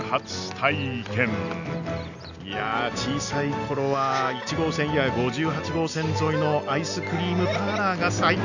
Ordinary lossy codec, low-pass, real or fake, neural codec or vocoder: none; 7.2 kHz; real; none